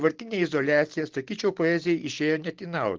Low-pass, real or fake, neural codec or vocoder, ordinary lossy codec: 7.2 kHz; real; none; Opus, 16 kbps